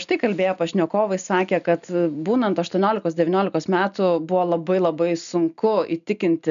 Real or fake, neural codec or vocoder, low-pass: real; none; 7.2 kHz